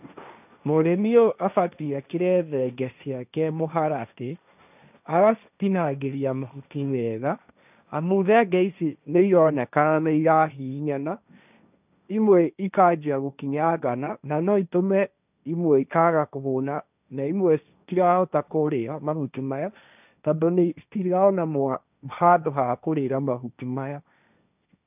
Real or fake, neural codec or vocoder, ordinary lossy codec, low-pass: fake; codec, 16 kHz, 1.1 kbps, Voila-Tokenizer; none; 3.6 kHz